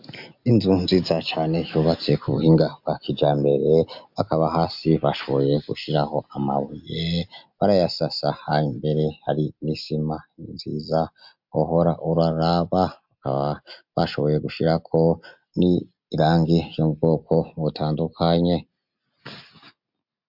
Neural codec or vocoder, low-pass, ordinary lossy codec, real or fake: none; 5.4 kHz; MP3, 48 kbps; real